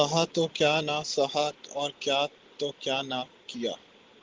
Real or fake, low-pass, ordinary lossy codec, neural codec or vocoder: real; 7.2 kHz; Opus, 16 kbps; none